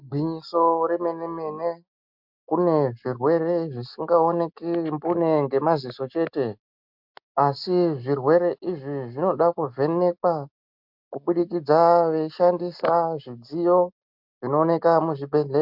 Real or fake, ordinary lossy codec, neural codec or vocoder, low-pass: real; MP3, 48 kbps; none; 5.4 kHz